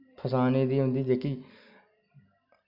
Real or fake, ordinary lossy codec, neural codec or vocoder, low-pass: real; none; none; 5.4 kHz